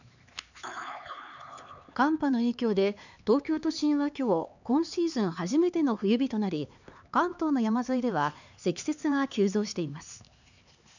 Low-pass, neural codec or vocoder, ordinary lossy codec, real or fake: 7.2 kHz; codec, 16 kHz, 4 kbps, X-Codec, HuBERT features, trained on LibriSpeech; none; fake